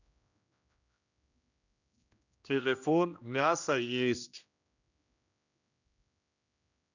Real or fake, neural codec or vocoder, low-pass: fake; codec, 16 kHz, 1 kbps, X-Codec, HuBERT features, trained on general audio; 7.2 kHz